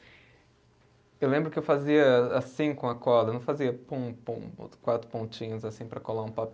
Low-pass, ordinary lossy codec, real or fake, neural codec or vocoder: none; none; real; none